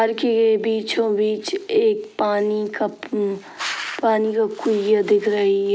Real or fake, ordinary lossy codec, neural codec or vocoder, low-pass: real; none; none; none